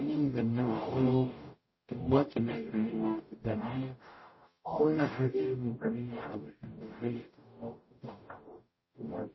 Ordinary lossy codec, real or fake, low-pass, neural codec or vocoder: MP3, 24 kbps; fake; 7.2 kHz; codec, 44.1 kHz, 0.9 kbps, DAC